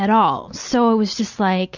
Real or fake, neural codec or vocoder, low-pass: real; none; 7.2 kHz